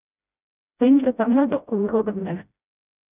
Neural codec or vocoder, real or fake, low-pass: codec, 16 kHz, 0.5 kbps, FreqCodec, smaller model; fake; 3.6 kHz